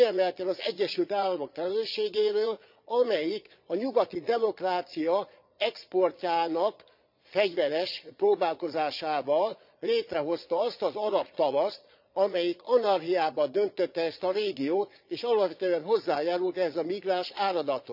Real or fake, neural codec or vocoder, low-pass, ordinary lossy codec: fake; vocoder, 22.05 kHz, 80 mel bands, Vocos; 5.4 kHz; none